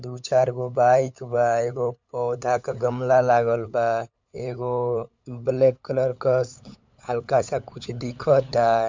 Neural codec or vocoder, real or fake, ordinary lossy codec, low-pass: codec, 16 kHz, 8 kbps, FunCodec, trained on LibriTTS, 25 frames a second; fake; MP3, 64 kbps; 7.2 kHz